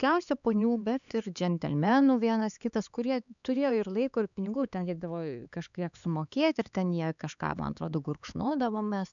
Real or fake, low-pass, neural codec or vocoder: fake; 7.2 kHz; codec, 16 kHz, 4 kbps, X-Codec, HuBERT features, trained on LibriSpeech